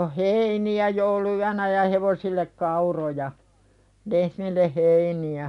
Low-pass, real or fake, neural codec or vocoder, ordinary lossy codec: 10.8 kHz; real; none; none